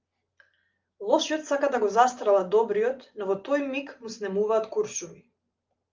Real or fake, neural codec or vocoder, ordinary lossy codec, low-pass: real; none; Opus, 24 kbps; 7.2 kHz